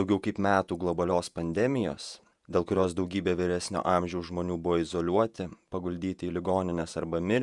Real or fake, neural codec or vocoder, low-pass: fake; vocoder, 48 kHz, 128 mel bands, Vocos; 10.8 kHz